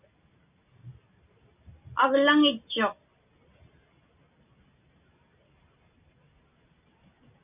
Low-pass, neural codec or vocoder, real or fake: 3.6 kHz; none; real